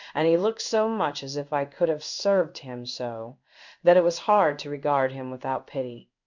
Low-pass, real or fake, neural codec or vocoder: 7.2 kHz; fake; codec, 16 kHz in and 24 kHz out, 1 kbps, XY-Tokenizer